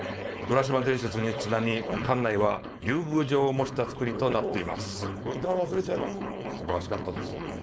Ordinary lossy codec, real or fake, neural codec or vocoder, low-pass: none; fake; codec, 16 kHz, 4.8 kbps, FACodec; none